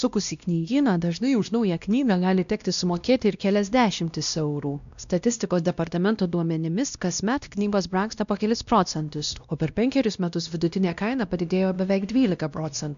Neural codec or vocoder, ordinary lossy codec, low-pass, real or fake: codec, 16 kHz, 1 kbps, X-Codec, WavLM features, trained on Multilingual LibriSpeech; MP3, 96 kbps; 7.2 kHz; fake